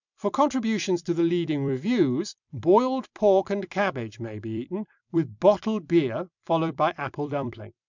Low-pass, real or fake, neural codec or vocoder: 7.2 kHz; fake; vocoder, 44.1 kHz, 128 mel bands every 256 samples, BigVGAN v2